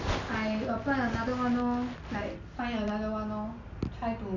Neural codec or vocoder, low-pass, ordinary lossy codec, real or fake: none; 7.2 kHz; none; real